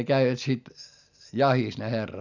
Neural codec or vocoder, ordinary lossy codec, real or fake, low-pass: none; none; real; 7.2 kHz